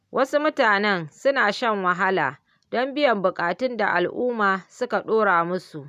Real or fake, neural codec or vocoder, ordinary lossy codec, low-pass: real; none; none; 14.4 kHz